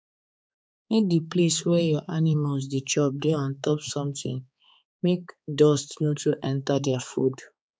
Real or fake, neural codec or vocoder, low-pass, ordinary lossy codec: fake; codec, 16 kHz, 4 kbps, X-Codec, HuBERT features, trained on balanced general audio; none; none